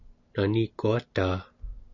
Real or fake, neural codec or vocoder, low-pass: real; none; 7.2 kHz